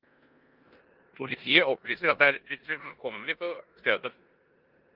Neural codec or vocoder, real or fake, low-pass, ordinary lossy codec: codec, 16 kHz in and 24 kHz out, 0.9 kbps, LongCat-Audio-Codec, four codebook decoder; fake; 5.4 kHz; Opus, 32 kbps